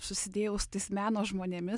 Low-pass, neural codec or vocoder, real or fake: 10.8 kHz; none; real